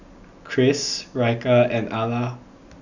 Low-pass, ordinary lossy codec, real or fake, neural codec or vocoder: 7.2 kHz; none; real; none